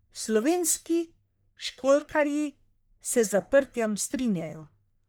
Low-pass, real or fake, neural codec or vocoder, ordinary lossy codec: none; fake; codec, 44.1 kHz, 1.7 kbps, Pupu-Codec; none